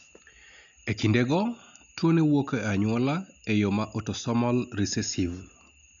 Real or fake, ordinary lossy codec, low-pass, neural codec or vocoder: real; none; 7.2 kHz; none